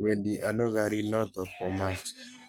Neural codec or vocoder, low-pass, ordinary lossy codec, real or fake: codec, 44.1 kHz, 3.4 kbps, Pupu-Codec; none; none; fake